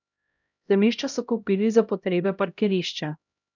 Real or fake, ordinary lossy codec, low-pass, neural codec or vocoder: fake; none; 7.2 kHz; codec, 16 kHz, 0.5 kbps, X-Codec, HuBERT features, trained on LibriSpeech